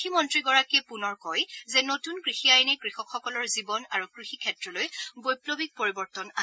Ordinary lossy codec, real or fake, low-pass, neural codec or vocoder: none; real; none; none